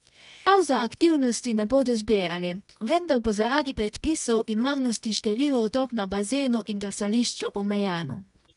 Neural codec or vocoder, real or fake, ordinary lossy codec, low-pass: codec, 24 kHz, 0.9 kbps, WavTokenizer, medium music audio release; fake; none; 10.8 kHz